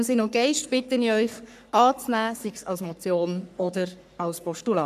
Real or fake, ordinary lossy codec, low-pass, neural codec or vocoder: fake; none; 14.4 kHz; codec, 44.1 kHz, 3.4 kbps, Pupu-Codec